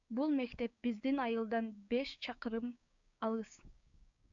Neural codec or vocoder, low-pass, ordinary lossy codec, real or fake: codec, 16 kHz, 6 kbps, DAC; 7.2 kHz; AAC, 64 kbps; fake